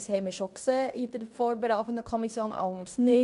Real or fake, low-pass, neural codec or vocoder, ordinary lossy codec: fake; 10.8 kHz; codec, 16 kHz in and 24 kHz out, 0.9 kbps, LongCat-Audio-Codec, fine tuned four codebook decoder; MP3, 64 kbps